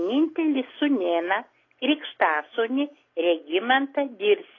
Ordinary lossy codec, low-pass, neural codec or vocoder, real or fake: AAC, 32 kbps; 7.2 kHz; none; real